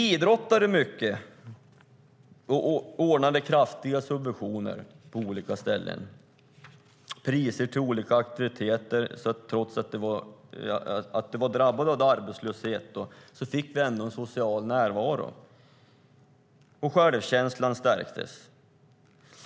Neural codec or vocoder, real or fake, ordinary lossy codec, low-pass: none; real; none; none